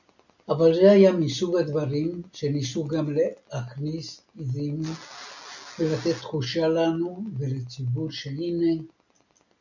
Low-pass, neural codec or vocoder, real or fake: 7.2 kHz; none; real